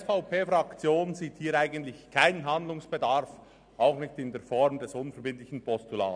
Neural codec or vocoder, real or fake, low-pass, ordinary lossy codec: none; real; 9.9 kHz; none